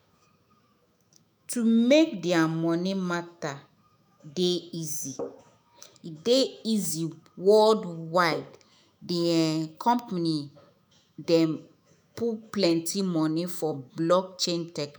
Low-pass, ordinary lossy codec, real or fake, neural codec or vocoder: none; none; fake; autoencoder, 48 kHz, 128 numbers a frame, DAC-VAE, trained on Japanese speech